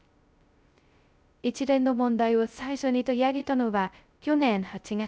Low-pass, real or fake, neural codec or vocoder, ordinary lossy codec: none; fake; codec, 16 kHz, 0.2 kbps, FocalCodec; none